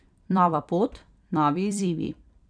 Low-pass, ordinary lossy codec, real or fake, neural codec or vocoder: 9.9 kHz; none; fake; vocoder, 44.1 kHz, 128 mel bands every 256 samples, BigVGAN v2